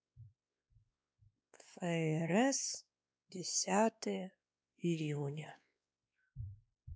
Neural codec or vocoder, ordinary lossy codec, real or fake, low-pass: codec, 16 kHz, 2 kbps, X-Codec, WavLM features, trained on Multilingual LibriSpeech; none; fake; none